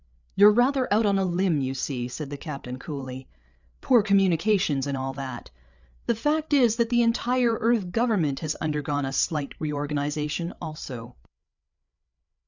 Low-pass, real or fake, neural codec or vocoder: 7.2 kHz; fake; codec, 16 kHz, 8 kbps, FreqCodec, larger model